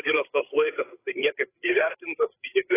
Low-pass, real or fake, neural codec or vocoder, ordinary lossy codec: 3.6 kHz; fake; codec, 16 kHz, 8 kbps, FreqCodec, larger model; AAC, 16 kbps